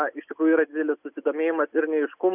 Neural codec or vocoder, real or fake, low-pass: none; real; 3.6 kHz